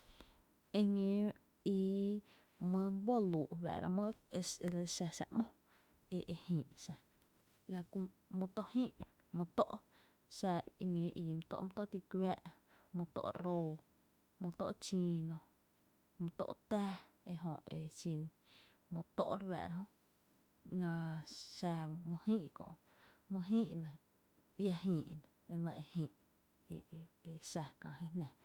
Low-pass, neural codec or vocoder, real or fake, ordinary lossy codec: 19.8 kHz; autoencoder, 48 kHz, 32 numbers a frame, DAC-VAE, trained on Japanese speech; fake; Opus, 64 kbps